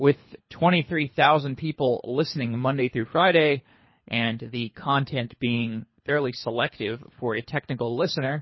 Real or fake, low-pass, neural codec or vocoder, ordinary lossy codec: fake; 7.2 kHz; codec, 24 kHz, 3 kbps, HILCodec; MP3, 24 kbps